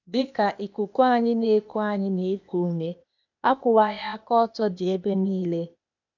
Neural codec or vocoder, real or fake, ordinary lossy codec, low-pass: codec, 16 kHz, 0.8 kbps, ZipCodec; fake; none; 7.2 kHz